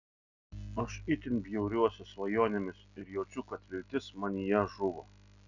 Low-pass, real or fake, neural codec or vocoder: 7.2 kHz; real; none